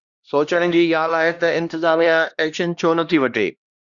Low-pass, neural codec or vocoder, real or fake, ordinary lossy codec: 7.2 kHz; codec, 16 kHz, 1 kbps, X-Codec, HuBERT features, trained on LibriSpeech; fake; Opus, 64 kbps